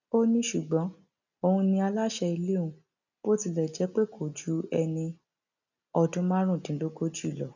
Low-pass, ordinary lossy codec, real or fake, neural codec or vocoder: 7.2 kHz; none; real; none